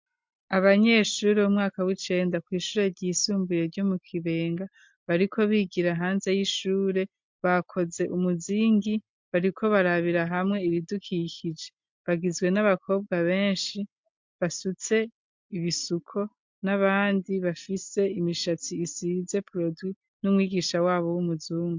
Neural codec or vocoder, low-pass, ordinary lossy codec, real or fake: none; 7.2 kHz; MP3, 64 kbps; real